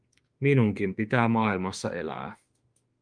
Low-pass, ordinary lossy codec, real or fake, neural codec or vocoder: 9.9 kHz; Opus, 32 kbps; fake; autoencoder, 48 kHz, 32 numbers a frame, DAC-VAE, trained on Japanese speech